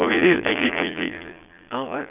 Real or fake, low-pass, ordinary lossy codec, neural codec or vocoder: fake; 3.6 kHz; none; vocoder, 22.05 kHz, 80 mel bands, Vocos